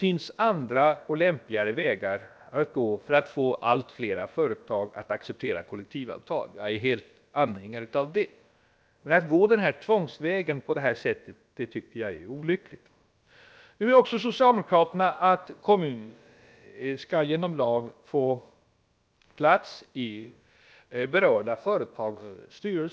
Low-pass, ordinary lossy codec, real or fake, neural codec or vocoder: none; none; fake; codec, 16 kHz, about 1 kbps, DyCAST, with the encoder's durations